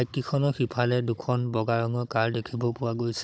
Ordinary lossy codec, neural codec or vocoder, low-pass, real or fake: none; codec, 16 kHz, 16 kbps, FunCodec, trained on Chinese and English, 50 frames a second; none; fake